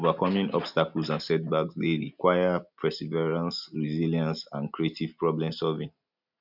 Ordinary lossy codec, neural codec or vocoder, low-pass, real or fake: none; none; 5.4 kHz; real